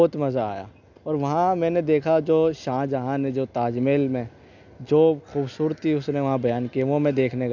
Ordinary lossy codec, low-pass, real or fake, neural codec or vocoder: none; 7.2 kHz; real; none